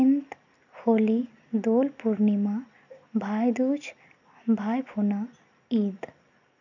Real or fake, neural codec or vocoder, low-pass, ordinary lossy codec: real; none; 7.2 kHz; none